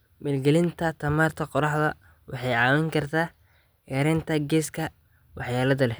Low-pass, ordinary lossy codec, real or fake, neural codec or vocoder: none; none; real; none